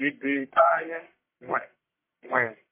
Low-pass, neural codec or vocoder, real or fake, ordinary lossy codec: 3.6 kHz; codec, 44.1 kHz, 1.7 kbps, Pupu-Codec; fake; MP3, 24 kbps